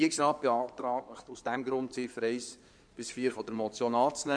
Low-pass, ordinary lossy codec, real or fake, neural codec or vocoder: 9.9 kHz; none; fake; codec, 16 kHz in and 24 kHz out, 2.2 kbps, FireRedTTS-2 codec